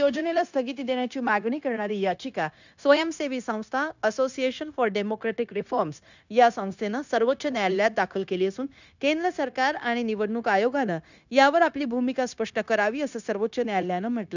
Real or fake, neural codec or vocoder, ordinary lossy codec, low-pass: fake; codec, 16 kHz, 0.9 kbps, LongCat-Audio-Codec; none; 7.2 kHz